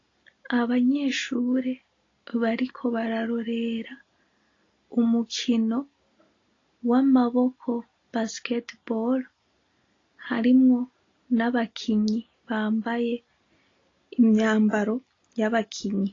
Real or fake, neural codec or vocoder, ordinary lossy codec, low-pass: real; none; AAC, 32 kbps; 7.2 kHz